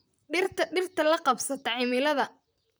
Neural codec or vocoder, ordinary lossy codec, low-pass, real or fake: vocoder, 44.1 kHz, 128 mel bands, Pupu-Vocoder; none; none; fake